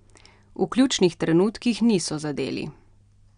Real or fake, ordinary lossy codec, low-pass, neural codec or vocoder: real; none; 9.9 kHz; none